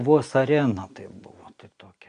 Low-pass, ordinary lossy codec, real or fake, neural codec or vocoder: 9.9 kHz; Opus, 64 kbps; real; none